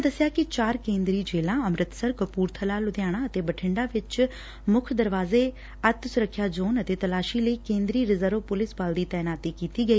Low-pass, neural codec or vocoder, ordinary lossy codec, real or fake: none; none; none; real